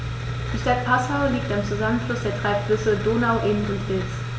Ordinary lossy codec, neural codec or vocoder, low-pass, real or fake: none; none; none; real